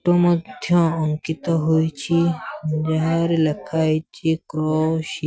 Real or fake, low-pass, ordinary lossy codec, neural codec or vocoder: real; none; none; none